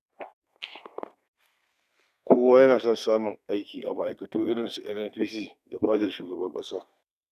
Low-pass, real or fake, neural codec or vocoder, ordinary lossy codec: 14.4 kHz; fake; codec, 32 kHz, 1.9 kbps, SNAC; none